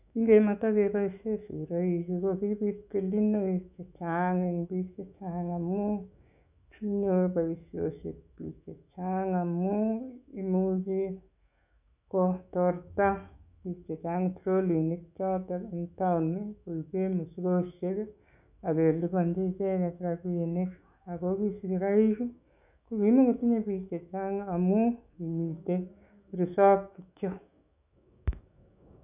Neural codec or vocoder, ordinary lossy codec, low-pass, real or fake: autoencoder, 48 kHz, 128 numbers a frame, DAC-VAE, trained on Japanese speech; none; 3.6 kHz; fake